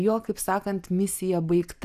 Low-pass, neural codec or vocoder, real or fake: 14.4 kHz; none; real